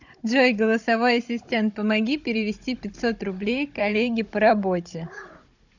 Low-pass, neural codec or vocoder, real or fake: 7.2 kHz; codec, 16 kHz, 16 kbps, FunCodec, trained on Chinese and English, 50 frames a second; fake